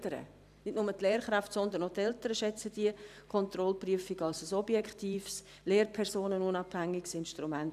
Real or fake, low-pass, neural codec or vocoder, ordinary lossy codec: fake; 14.4 kHz; vocoder, 44.1 kHz, 128 mel bands every 512 samples, BigVGAN v2; none